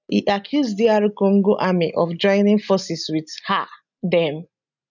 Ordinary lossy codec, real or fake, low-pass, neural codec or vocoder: none; real; 7.2 kHz; none